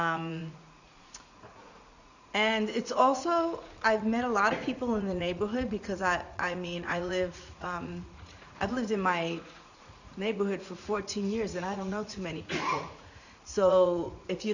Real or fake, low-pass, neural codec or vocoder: fake; 7.2 kHz; vocoder, 44.1 kHz, 80 mel bands, Vocos